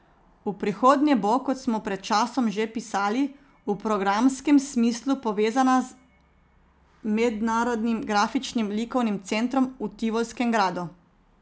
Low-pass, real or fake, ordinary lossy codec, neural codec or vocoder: none; real; none; none